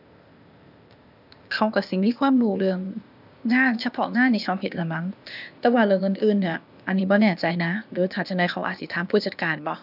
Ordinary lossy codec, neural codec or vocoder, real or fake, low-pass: none; codec, 16 kHz, 0.8 kbps, ZipCodec; fake; 5.4 kHz